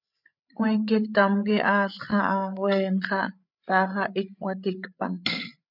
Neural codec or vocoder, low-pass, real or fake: codec, 16 kHz, 8 kbps, FreqCodec, larger model; 5.4 kHz; fake